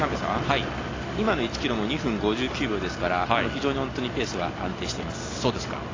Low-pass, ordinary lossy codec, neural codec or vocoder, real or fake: 7.2 kHz; AAC, 32 kbps; none; real